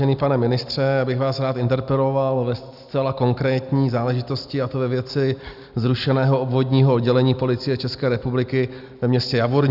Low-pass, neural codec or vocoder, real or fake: 5.4 kHz; none; real